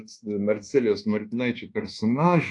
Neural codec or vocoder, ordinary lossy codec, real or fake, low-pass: codec, 24 kHz, 1.2 kbps, DualCodec; AAC, 48 kbps; fake; 10.8 kHz